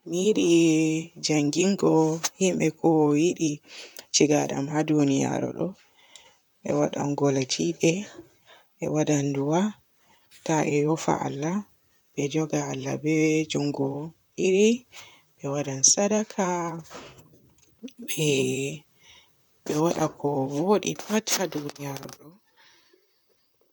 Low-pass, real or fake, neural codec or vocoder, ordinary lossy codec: none; fake; vocoder, 44.1 kHz, 128 mel bands, Pupu-Vocoder; none